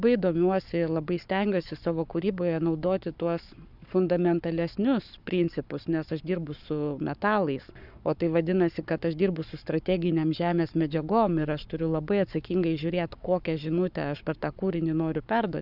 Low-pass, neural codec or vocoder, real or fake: 5.4 kHz; codec, 44.1 kHz, 7.8 kbps, Pupu-Codec; fake